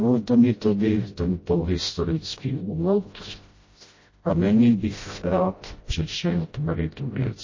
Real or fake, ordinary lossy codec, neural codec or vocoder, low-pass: fake; MP3, 32 kbps; codec, 16 kHz, 0.5 kbps, FreqCodec, smaller model; 7.2 kHz